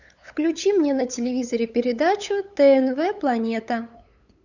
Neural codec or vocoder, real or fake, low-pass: codec, 16 kHz, 8 kbps, FunCodec, trained on LibriTTS, 25 frames a second; fake; 7.2 kHz